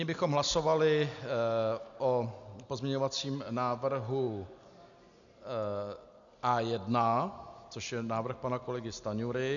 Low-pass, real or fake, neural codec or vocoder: 7.2 kHz; real; none